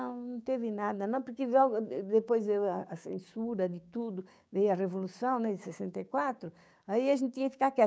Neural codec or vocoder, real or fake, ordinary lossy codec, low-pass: codec, 16 kHz, 6 kbps, DAC; fake; none; none